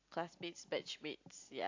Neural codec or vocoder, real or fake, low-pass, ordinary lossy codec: none; real; 7.2 kHz; AAC, 48 kbps